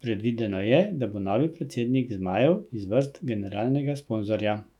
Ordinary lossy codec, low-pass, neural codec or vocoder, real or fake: none; 19.8 kHz; autoencoder, 48 kHz, 128 numbers a frame, DAC-VAE, trained on Japanese speech; fake